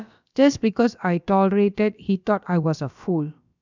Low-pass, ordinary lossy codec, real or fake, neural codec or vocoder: 7.2 kHz; none; fake; codec, 16 kHz, about 1 kbps, DyCAST, with the encoder's durations